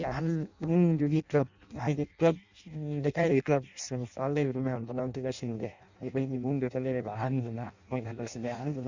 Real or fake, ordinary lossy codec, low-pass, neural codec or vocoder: fake; Opus, 64 kbps; 7.2 kHz; codec, 16 kHz in and 24 kHz out, 0.6 kbps, FireRedTTS-2 codec